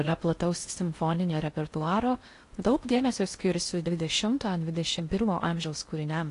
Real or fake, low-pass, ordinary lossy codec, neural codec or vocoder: fake; 10.8 kHz; AAC, 48 kbps; codec, 16 kHz in and 24 kHz out, 0.6 kbps, FocalCodec, streaming, 4096 codes